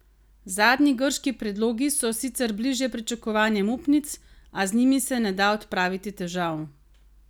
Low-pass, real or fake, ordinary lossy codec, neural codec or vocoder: none; real; none; none